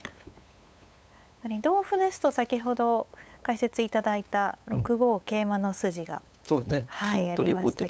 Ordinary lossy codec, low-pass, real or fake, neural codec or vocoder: none; none; fake; codec, 16 kHz, 8 kbps, FunCodec, trained on LibriTTS, 25 frames a second